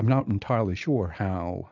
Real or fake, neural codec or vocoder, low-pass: real; none; 7.2 kHz